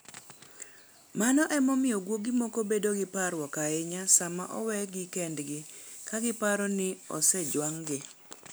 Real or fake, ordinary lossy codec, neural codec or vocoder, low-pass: real; none; none; none